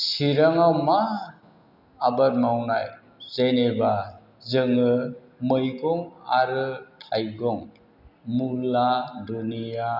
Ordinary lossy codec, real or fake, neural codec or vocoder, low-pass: none; real; none; 5.4 kHz